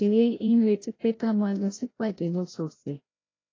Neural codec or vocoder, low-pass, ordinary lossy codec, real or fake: codec, 16 kHz, 0.5 kbps, FreqCodec, larger model; 7.2 kHz; AAC, 32 kbps; fake